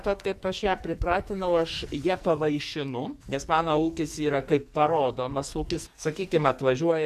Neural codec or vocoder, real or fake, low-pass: codec, 44.1 kHz, 2.6 kbps, SNAC; fake; 14.4 kHz